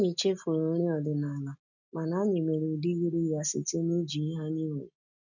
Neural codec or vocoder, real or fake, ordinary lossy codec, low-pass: none; real; none; 7.2 kHz